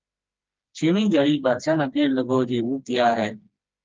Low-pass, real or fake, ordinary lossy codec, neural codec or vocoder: 7.2 kHz; fake; Opus, 24 kbps; codec, 16 kHz, 2 kbps, FreqCodec, smaller model